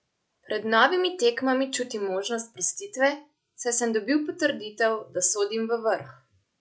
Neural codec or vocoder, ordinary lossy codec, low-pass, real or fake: none; none; none; real